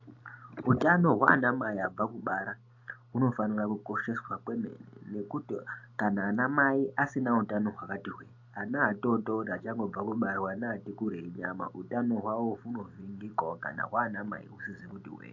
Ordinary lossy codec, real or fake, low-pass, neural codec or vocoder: AAC, 48 kbps; real; 7.2 kHz; none